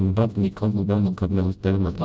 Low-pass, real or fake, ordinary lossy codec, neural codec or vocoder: none; fake; none; codec, 16 kHz, 0.5 kbps, FreqCodec, smaller model